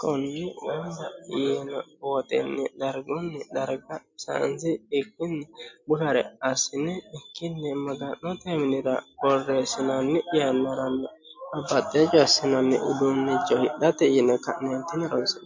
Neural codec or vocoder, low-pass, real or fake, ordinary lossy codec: none; 7.2 kHz; real; MP3, 48 kbps